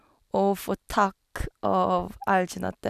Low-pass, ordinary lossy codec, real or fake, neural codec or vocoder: 14.4 kHz; none; real; none